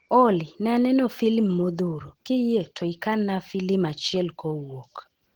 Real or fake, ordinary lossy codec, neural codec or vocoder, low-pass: real; Opus, 16 kbps; none; 19.8 kHz